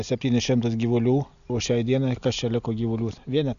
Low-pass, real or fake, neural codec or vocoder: 7.2 kHz; real; none